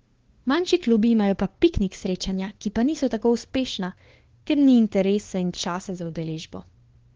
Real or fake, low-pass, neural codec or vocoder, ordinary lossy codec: fake; 7.2 kHz; codec, 16 kHz, 2 kbps, FunCodec, trained on LibriTTS, 25 frames a second; Opus, 16 kbps